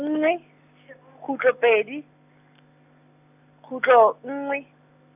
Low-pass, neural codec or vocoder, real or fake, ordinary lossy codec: 3.6 kHz; none; real; none